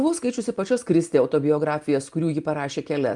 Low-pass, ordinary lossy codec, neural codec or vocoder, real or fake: 10.8 kHz; Opus, 32 kbps; none; real